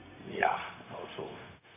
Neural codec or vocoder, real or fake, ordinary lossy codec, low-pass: codec, 16 kHz, 0.4 kbps, LongCat-Audio-Codec; fake; none; 3.6 kHz